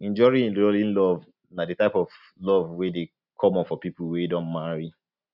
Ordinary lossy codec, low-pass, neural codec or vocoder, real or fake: none; 5.4 kHz; none; real